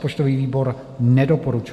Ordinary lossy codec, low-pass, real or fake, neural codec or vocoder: MP3, 64 kbps; 14.4 kHz; real; none